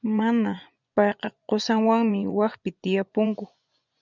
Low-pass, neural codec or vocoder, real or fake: 7.2 kHz; vocoder, 24 kHz, 100 mel bands, Vocos; fake